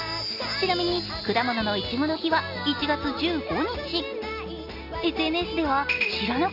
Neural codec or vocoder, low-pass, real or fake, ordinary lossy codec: none; 5.4 kHz; real; none